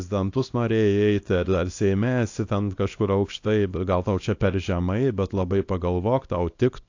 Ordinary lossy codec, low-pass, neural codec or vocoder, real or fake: AAC, 48 kbps; 7.2 kHz; codec, 24 kHz, 0.9 kbps, WavTokenizer, small release; fake